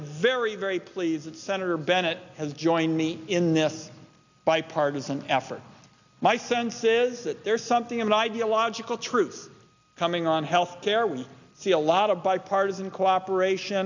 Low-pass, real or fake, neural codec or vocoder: 7.2 kHz; real; none